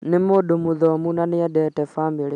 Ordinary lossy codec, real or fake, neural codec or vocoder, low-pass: none; real; none; 10.8 kHz